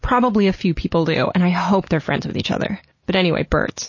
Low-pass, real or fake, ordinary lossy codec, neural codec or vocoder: 7.2 kHz; real; MP3, 32 kbps; none